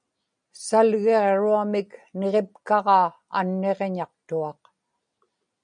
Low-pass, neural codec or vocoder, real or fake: 9.9 kHz; none; real